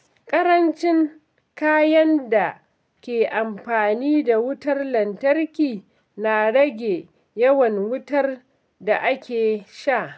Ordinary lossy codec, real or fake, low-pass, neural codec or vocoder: none; real; none; none